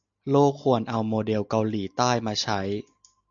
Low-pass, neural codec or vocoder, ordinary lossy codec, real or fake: 7.2 kHz; none; MP3, 96 kbps; real